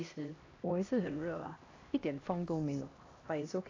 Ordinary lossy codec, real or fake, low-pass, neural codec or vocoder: AAC, 32 kbps; fake; 7.2 kHz; codec, 16 kHz, 1 kbps, X-Codec, HuBERT features, trained on LibriSpeech